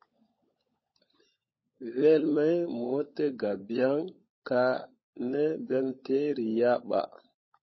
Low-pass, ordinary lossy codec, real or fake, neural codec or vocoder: 7.2 kHz; MP3, 24 kbps; fake; codec, 16 kHz, 16 kbps, FunCodec, trained on LibriTTS, 50 frames a second